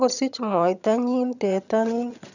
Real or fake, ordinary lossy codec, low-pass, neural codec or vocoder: fake; none; 7.2 kHz; vocoder, 22.05 kHz, 80 mel bands, HiFi-GAN